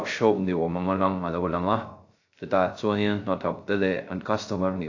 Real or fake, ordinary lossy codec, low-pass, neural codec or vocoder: fake; AAC, 48 kbps; 7.2 kHz; codec, 16 kHz, 0.3 kbps, FocalCodec